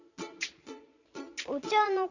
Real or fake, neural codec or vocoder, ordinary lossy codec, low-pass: fake; vocoder, 22.05 kHz, 80 mel bands, Vocos; none; 7.2 kHz